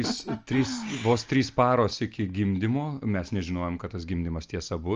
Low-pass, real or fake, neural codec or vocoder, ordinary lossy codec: 7.2 kHz; real; none; Opus, 24 kbps